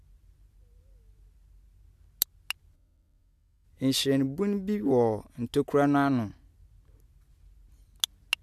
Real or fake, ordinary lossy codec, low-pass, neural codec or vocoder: real; AAC, 96 kbps; 14.4 kHz; none